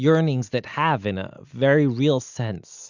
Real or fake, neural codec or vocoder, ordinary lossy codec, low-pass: real; none; Opus, 64 kbps; 7.2 kHz